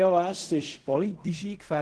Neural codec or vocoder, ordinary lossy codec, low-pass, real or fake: codec, 16 kHz in and 24 kHz out, 0.4 kbps, LongCat-Audio-Codec, fine tuned four codebook decoder; Opus, 16 kbps; 10.8 kHz; fake